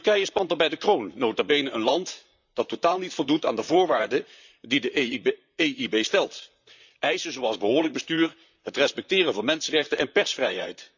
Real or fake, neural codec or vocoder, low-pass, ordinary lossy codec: fake; vocoder, 44.1 kHz, 128 mel bands, Pupu-Vocoder; 7.2 kHz; none